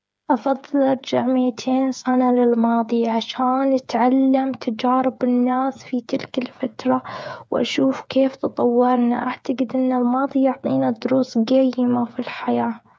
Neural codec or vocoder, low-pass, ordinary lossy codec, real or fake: codec, 16 kHz, 8 kbps, FreqCodec, smaller model; none; none; fake